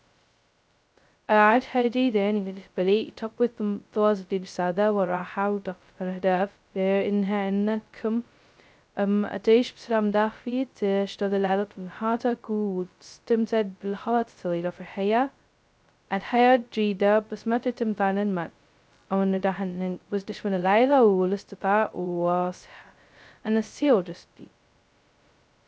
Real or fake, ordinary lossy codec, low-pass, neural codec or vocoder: fake; none; none; codec, 16 kHz, 0.2 kbps, FocalCodec